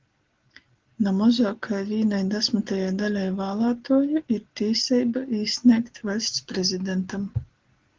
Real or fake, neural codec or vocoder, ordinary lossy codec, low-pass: real; none; Opus, 16 kbps; 7.2 kHz